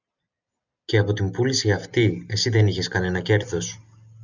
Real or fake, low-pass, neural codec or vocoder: real; 7.2 kHz; none